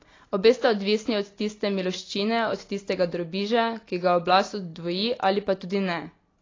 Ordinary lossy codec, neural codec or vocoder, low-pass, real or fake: AAC, 32 kbps; none; 7.2 kHz; real